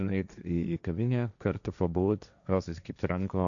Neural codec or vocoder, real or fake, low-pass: codec, 16 kHz, 1.1 kbps, Voila-Tokenizer; fake; 7.2 kHz